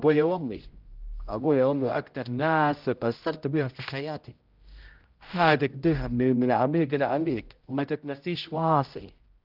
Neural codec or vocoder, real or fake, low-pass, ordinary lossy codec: codec, 16 kHz, 0.5 kbps, X-Codec, HuBERT features, trained on general audio; fake; 5.4 kHz; Opus, 32 kbps